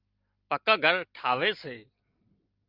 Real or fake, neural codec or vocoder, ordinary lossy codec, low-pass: real; none; Opus, 24 kbps; 5.4 kHz